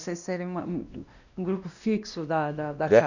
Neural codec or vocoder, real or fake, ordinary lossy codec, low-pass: codec, 16 kHz, 1 kbps, X-Codec, WavLM features, trained on Multilingual LibriSpeech; fake; none; 7.2 kHz